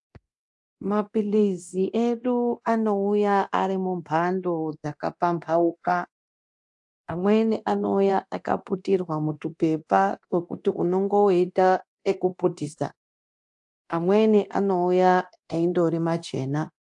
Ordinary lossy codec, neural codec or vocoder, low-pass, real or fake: AAC, 64 kbps; codec, 24 kHz, 0.9 kbps, DualCodec; 10.8 kHz; fake